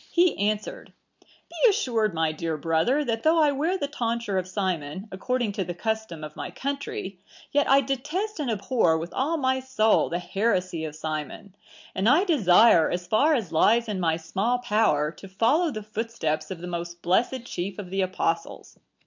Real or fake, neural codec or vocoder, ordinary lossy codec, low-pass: real; none; MP3, 64 kbps; 7.2 kHz